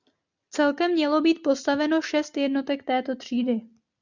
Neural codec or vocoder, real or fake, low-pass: none; real; 7.2 kHz